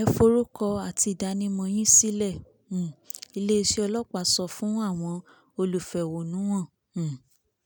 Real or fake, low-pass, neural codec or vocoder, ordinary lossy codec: real; none; none; none